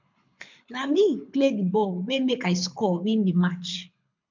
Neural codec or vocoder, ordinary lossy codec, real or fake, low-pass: codec, 24 kHz, 6 kbps, HILCodec; MP3, 64 kbps; fake; 7.2 kHz